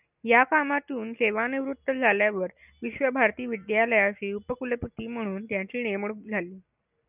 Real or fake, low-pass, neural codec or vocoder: real; 3.6 kHz; none